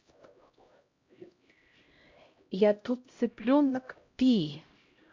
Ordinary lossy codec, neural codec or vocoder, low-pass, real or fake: MP3, 48 kbps; codec, 16 kHz, 0.5 kbps, X-Codec, HuBERT features, trained on LibriSpeech; 7.2 kHz; fake